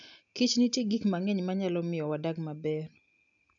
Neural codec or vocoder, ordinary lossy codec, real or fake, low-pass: none; none; real; 7.2 kHz